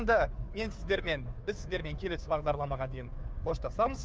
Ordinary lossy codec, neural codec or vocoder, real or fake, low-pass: none; codec, 16 kHz, 2 kbps, FunCodec, trained on Chinese and English, 25 frames a second; fake; none